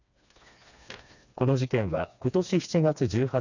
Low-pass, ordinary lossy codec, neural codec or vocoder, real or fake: 7.2 kHz; none; codec, 16 kHz, 2 kbps, FreqCodec, smaller model; fake